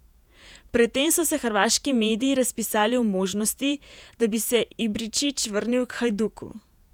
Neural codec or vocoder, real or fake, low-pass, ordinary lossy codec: vocoder, 48 kHz, 128 mel bands, Vocos; fake; 19.8 kHz; none